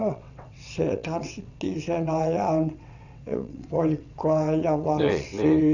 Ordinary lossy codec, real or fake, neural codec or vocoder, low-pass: none; fake; vocoder, 22.05 kHz, 80 mel bands, WaveNeXt; 7.2 kHz